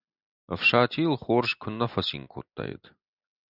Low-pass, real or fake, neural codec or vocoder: 5.4 kHz; real; none